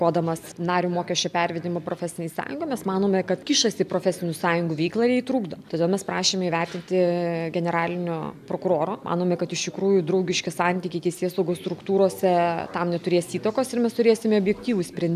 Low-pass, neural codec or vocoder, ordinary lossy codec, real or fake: 14.4 kHz; none; AAC, 96 kbps; real